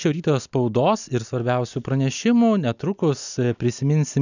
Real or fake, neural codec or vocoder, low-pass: real; none; 7.2 kHz